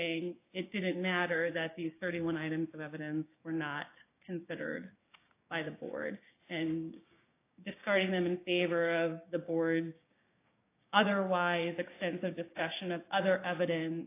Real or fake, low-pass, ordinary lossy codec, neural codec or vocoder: fake; 3.6 kHz; AAC, 24 kbps; codec, 16 kHz, 6 kbps, DAC